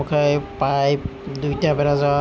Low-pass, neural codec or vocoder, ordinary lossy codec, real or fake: none; none; none; real